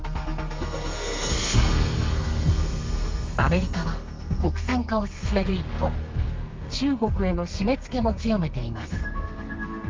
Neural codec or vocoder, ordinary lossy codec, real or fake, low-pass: codec, 32 kHz, 1.9 kbps, SNAC; Opus, 32 kbps; fake; 7.2 kHz